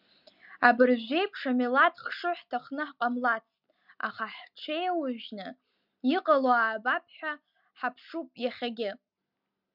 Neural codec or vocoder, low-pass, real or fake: none; 5.4 kHz; real